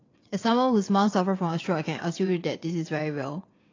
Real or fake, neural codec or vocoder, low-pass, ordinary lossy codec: fake; vocoder, 22.05 kHz, 80 mel bands, WaveNeXt; 7.2 kHz; AAC, 32 kbps